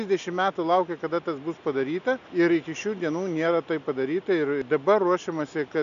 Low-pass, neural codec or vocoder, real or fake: 7.2 kHz; none; real